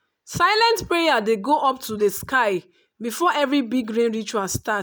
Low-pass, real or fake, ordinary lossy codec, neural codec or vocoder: none; real; none; none